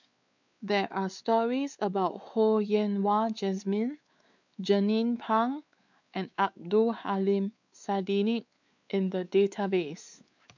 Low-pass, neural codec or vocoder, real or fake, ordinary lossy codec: 7.2 kHz; codec, 16 kHz, 4 kbps, X-Codec, WavLM features, trained on Multilingual LibriSpeech; fake; none